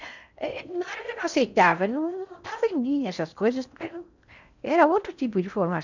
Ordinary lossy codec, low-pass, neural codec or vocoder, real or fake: none; 7.2 kHz; codec, 16 kHz in and 24 kHz out, 0.6 kbps, FocalCodec, streaming, 4096 codes; fake